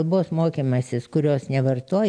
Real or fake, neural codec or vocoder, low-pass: real; none; 9.9 kHz